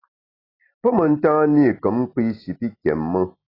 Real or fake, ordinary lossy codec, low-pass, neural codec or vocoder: real; AAC, 24 kbps; 5.4 kHz; none